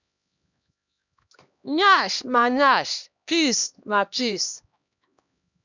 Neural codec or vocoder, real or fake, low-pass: codec, 16 kHz, 1 kbps, X-Codec, HuBERT features, trained on LibriSpeech; fake; 7.2 kHz